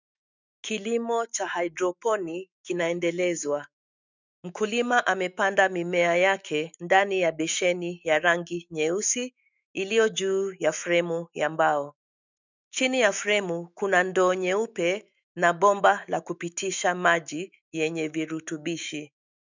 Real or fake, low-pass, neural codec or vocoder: fake; 7.2 kHz; autoencoder, 48 kHz, 128 numbers a frame, DAC-VAE, trained on Japanese speech